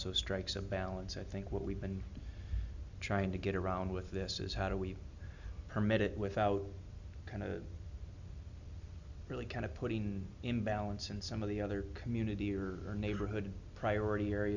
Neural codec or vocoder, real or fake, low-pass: none; real; 7.2 kHz